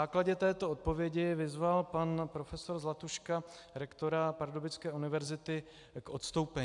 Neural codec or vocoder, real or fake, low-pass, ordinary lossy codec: none; real; 10.8 kHz; AAC, 64 kbps